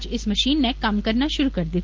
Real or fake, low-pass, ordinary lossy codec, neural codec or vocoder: real; 7.2 kHz; Opus, 16 kbps; none